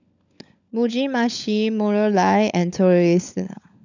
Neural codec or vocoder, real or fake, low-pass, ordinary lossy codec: codec, 16 kHz, 16 kbps, FunCodec, trained on LibriTTS, 50 frames a second; fake; 7.2 kHz; none